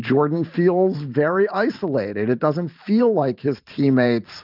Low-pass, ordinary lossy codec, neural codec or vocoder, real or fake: 5.4 kHz; Opus, 32 kbps; vocoder, 44.1 kHz, 128 mel bands every 512 samples, BigVGAN v2; fake